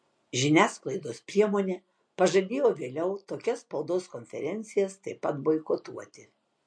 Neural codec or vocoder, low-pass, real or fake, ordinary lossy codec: none; 9.9 kHz; real; MP3, 48 kbps